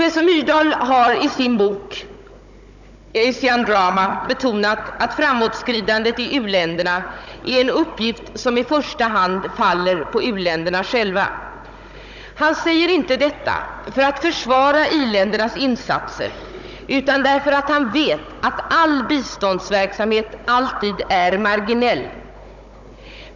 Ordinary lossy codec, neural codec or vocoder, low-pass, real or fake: none; codec, 16 kHz, 16 kbps, FunCodec, trained on Chinese and English, 50 frames a second; 7.2 kHz; fake